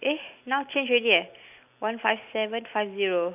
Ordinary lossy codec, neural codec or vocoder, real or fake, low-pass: none; none; real; 3.6 kHz